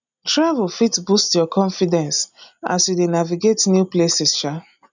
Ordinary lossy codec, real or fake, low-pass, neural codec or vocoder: none; real; 7.2 kHz; none